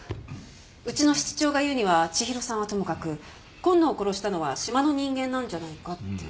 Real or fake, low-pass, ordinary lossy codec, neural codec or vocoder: real; none; none; none